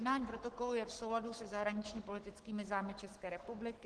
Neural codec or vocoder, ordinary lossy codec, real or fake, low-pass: codec, 44.1 kHz, 7.8 kbps, Pupu-Codec; Opus, 16 kbps; fake; 10.8 kHz